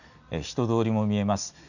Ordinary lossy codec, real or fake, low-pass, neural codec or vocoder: none; real; 7.2 kHz; none